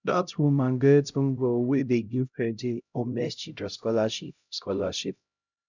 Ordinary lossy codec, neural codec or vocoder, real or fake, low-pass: none; codec, 16 kHz, 0.5 kbps, X-Codec, HuBERT features, trained on LibriSpeech; fake; 7.2 kHz